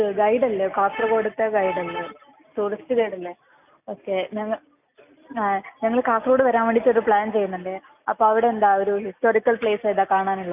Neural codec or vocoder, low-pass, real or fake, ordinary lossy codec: none; 3.6 kHz; real; none